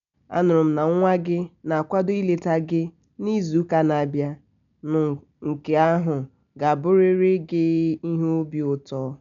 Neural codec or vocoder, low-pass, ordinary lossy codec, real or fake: none; 7.2 kHz; none; real